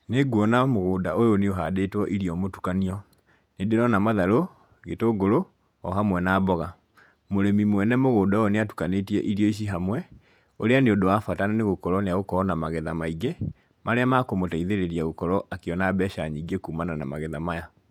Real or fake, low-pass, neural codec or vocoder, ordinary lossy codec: fake; 19.8 kHz; vocoder, 48 kHz, 128 mel bands, Vocos; none